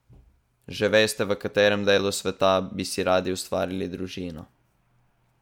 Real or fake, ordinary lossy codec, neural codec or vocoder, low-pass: real; MP3, 96 kbps; none; 19.8 kHz